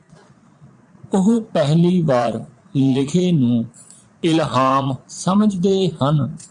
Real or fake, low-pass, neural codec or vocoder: fake; 9.9 kHz; vocoder, 22.05 kHz, 80 mel bands, Vocos